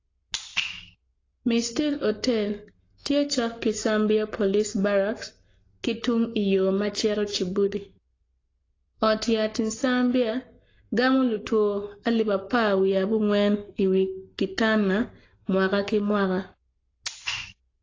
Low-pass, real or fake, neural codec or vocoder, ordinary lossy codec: 7.2 kHz; fake; codec, 44.1 kHz, 7.8 kbps, Pupu-Codec; AAC, 32 kbps